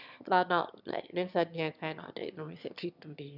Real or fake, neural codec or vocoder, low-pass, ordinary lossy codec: fake; autoencoder, 22.05 kHz, a latent of 192 numbers a frame, VITS, trained on one speaker; 5.4 kHz; none